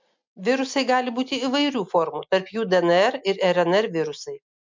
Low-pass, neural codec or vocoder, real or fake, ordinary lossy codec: 7.2 kHz; none; real; MP3, 64 kbps